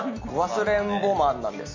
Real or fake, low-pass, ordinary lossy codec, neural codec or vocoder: real; 7.2 kHz; MP3, 32 kbps; none